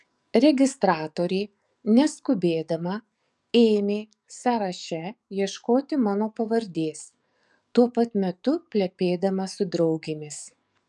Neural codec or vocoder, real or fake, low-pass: codec, 44.1 kHz, 7.8 kbps, DAC; fake; 10.8 kHz